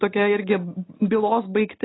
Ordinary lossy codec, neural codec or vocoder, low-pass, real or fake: AAC, 16 kbps; none; 7.2 kHz; real